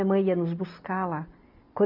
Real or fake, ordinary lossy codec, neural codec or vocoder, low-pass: real; none; none; 5.4 kHz